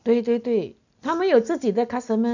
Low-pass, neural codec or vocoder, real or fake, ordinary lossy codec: 7.2 kHz; none; real; none